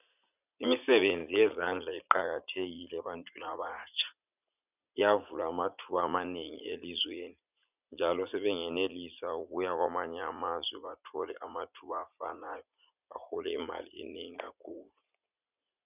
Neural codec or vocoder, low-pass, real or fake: vocoder, 44.1 kHz, 80 mel bands, Vocos; 3.6 kHz; fake